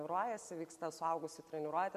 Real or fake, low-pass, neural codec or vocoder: real; 14.4 kHz; none